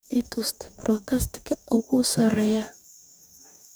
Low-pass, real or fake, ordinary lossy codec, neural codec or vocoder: none; fake; none; codec, 44.1 kHz, 2.6 kbps, DAC